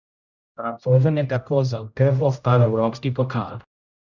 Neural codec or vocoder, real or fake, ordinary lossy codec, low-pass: codec, 16 kHz, 0.5 kbps, X-Codec, HuBERT features, trained on general audio; fake; none; 7.2 kHz